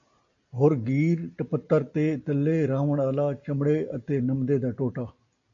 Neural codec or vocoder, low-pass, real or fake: none; 7.2 kHz; real